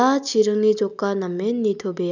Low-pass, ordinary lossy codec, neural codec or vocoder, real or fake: 7.2 kHz; none; none; real